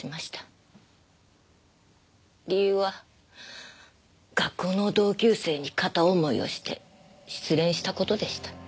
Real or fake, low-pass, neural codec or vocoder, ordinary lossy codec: real; none; none; none